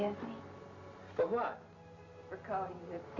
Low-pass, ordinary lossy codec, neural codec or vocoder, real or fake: 7.2 kHz; MP3, 48 kbps; none; real